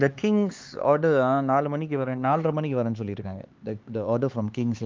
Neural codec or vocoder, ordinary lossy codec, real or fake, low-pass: codec, 16 kHz, 4 kbps, X-Codec, HuBERT features, trained on LibriSpeech; Opus, 24 kbps; fake; 7.2 kHz